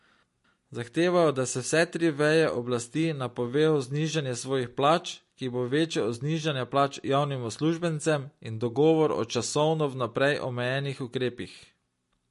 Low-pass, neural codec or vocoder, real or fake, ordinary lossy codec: 14.4 kHz; none; real; MP3, 48 kbps